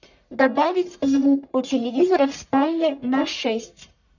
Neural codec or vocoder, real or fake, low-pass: codec, 44.1 kHz, 1.7 kbps, Pupu-Codec; fake; 7.2 kHz